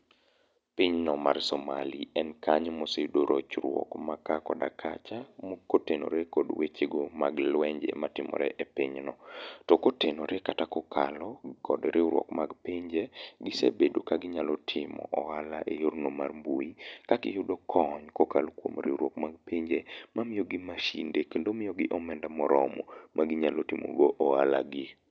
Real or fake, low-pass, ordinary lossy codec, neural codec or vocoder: real; none; none; none